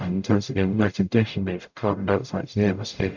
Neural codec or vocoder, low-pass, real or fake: codec, 44.1 kHz, 0.9 kbps, DAC; 7.2 kHz; fake